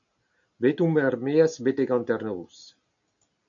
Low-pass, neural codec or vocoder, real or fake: 7.2 kHz; none; real